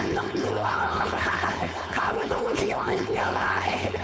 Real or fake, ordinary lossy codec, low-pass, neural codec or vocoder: fake; none; none; codec, 16 kHz, 4.8 kbps, FACodec